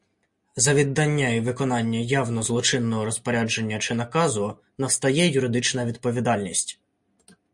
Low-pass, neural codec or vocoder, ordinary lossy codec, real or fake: 10.8 kHz; none; MP3, 48 kbps; real